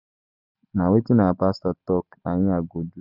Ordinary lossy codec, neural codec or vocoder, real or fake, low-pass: none; none; real; 5.4 kHz